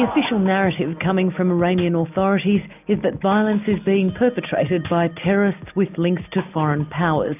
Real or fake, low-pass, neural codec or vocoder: real; 3.6 kHz; none